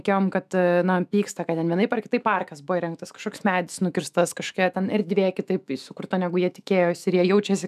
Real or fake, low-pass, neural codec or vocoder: fake; 14.4 kHz; autoencoder, 48 kHz, 128 numbers a frame, DAC-VAE, trained on Japanese speech